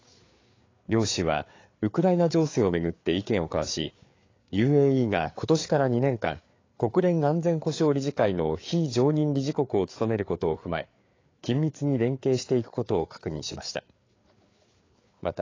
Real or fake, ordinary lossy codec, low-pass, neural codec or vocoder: fake; AAC, 32 kbps; 7.2 kHz; codec, 16 kHz, 4 kbps, FreqCodec, larger model